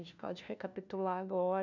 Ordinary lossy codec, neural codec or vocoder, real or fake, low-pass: none; codec, 16 kHz, 1 kbps, FunCodec, trained on LibriTTS, 50 frames a second; fake; 7.2 kHz